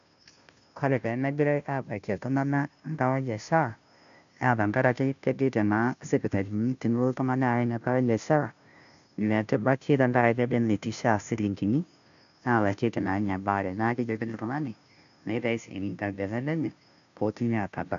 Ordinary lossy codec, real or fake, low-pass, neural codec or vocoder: AAC, 96 kbps; fake; 7.2 kHz; codec, 16 kHz, 0.5 kbps, FunCodec, trained on Chinese and English, 25 frames a second